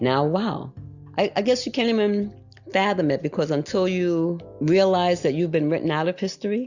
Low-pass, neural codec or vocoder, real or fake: 7.2 kHz; none; real